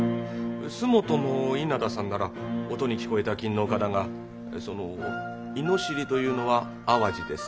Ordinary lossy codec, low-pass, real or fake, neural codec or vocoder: none; none; real; none